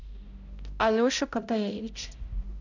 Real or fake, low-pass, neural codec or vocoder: fake; 7.2 kHz; codec, 16 kHz, 0.5 kbps, X-Codec, HuBERT features, trained on balanced general audio